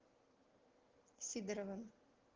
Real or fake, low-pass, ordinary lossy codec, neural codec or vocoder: real; 7.2 kHz; Opus, 16 kbps; none